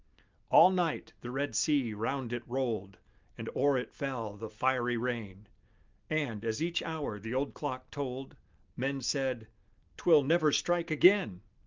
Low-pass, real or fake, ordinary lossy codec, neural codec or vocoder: 7.2 kHz; real; Opus, 24 kbps; none